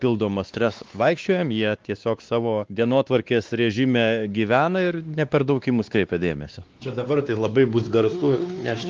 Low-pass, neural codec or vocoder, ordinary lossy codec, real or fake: 7.2 kHz; codec, 16 kHz, 2 kbps, X-Codec, WavLM features, trained on Multilingual LibriSpeech; Opus, 32 kbps; fake